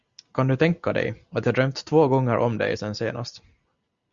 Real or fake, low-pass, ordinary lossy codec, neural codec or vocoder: real; 7.2 kHz; Opus, 64 kbps; none